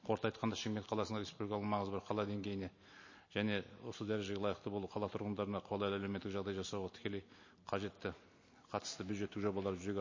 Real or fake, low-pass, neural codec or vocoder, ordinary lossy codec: real; 7.2 kHz; none; MP3, 32 kbps